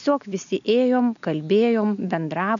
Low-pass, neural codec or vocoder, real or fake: 7.2 kHz; none; real